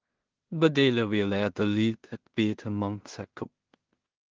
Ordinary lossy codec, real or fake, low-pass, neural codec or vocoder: Opus, 16 kbps; fake; 7.2 kHz; codec, 16 kHz in and 24 kHz out, 0.4 kbps, LongCat-Audio-Codec, two codebook decoder